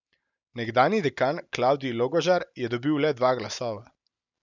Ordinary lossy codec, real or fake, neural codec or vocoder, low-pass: none; real; none; 7.2 kHz